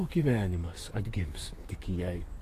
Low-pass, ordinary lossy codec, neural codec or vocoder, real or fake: 14.4 kHz; AAC, 48 kbps; codec, 44.1 kHz, 7.8 kbps, DAC; fake